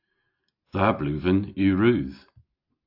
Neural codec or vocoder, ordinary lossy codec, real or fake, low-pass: none; AAC, 48 kbps; real; 5.4 kHz